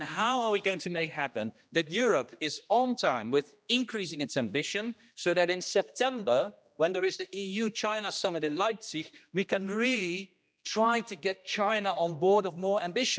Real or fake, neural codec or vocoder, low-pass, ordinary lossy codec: fake; codec, 16 kHz, 1 kbps, X-Codec, HuBERT features, trained on general audio; none; none